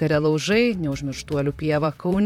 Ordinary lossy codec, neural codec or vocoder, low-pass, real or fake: MP3, 96 kbps; vocoder, 44.1 kHz, 128 mel bands, Pupu-Vocoder; 19.8 kHz; fake